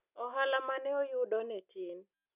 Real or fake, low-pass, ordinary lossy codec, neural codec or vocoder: real; 3.6 kHz; none; none